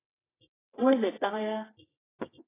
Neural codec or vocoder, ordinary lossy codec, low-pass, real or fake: codec, 24 kHz, 0.9 kbps, WavTokenizer, medium music audio release; AAC, 16 kbps; 3.6 kHz; fake